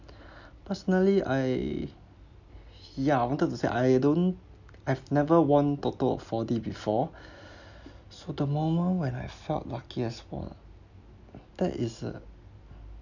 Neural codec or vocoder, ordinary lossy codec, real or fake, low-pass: none; none; real; 7.2 kHz